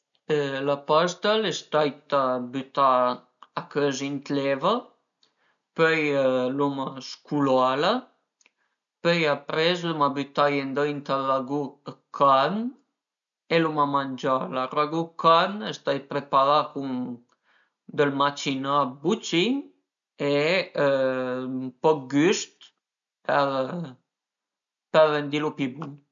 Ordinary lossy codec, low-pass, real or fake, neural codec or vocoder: none; 7.2 kHz; real; none